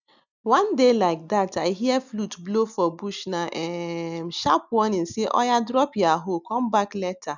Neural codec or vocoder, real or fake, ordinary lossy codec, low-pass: none; real; none; 7.2 kHz